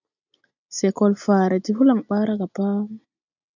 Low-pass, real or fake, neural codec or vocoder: 7.2 kHz; real; none